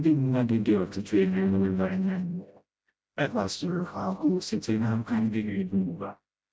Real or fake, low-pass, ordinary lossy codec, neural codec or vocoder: fake; none; none; codec, 16 kHz, 0.5 kbps, FreqCodec, smaller model